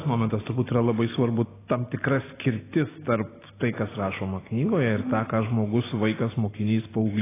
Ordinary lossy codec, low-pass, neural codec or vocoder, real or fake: AAC, 16 kbps; 3.6 kHz; none; real